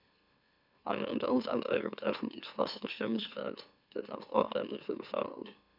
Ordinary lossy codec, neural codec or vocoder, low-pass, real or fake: none; autoencoder, 44.1 kHz, a latent of 192 numbers a frame, MeloTTS; 5.4 kHz; fake